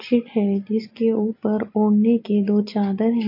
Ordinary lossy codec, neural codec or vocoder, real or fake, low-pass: MP3, 32 kbps; none; real; 5.4 kHz